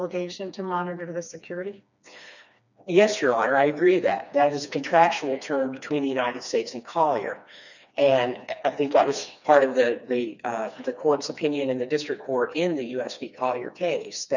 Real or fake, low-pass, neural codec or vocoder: fake; 7.2 kHz; codec, 16 kHz, 2 kbps, FreqCodec, smaller model